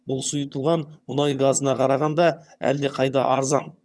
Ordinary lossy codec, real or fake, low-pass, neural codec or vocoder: none; fake; none; vocoder, 22.05 kHz, 80 mel bands, HiFi-GAN